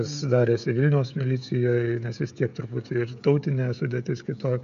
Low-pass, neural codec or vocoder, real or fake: 7.2 kHz; codec, 16 kHz, 16 kbps, FreqCodec, smaller model; fake